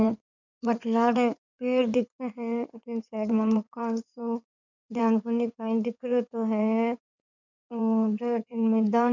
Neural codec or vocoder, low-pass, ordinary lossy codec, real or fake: codec, 16 kHz in and 24 kHz out, 2.2 kbps, FireRedTTS-2 codec; 7.2 kHz; none; fake